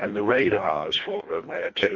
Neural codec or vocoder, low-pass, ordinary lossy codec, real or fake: codec, 24 kHz, 1.5 kbps, HILCodec; 7.2 kHz; MP3, 48 kbps; fake